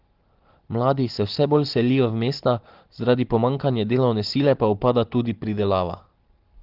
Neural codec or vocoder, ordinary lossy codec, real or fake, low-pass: none; Opus, 16 kbps; real; 5.4 kHz